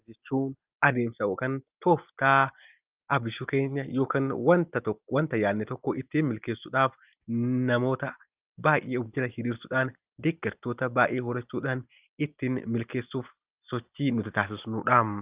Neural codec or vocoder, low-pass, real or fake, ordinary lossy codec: none; 3.6 kHz; real; Opus, 24 kbps